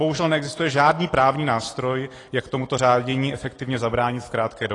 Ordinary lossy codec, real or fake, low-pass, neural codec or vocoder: AAC, 32 kbps; real; 10.8 kHz; none